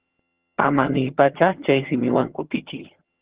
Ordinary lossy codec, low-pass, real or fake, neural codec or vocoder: Opus, 16 kbps; 3.6 kHz; fake; vocoder, 22.05 kHz, 80 mel bands, HiFi-GAN